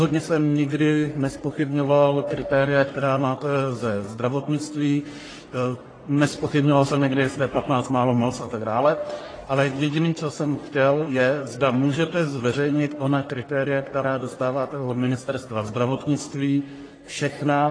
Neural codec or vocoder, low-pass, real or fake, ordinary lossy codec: codec, 44.1 kHz, 1.7 kbps, Pupu-Codec; 9.9 kHz; fake; AAC, 32 kbps